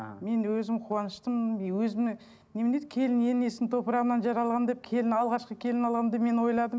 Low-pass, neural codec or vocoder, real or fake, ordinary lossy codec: none; none; real; none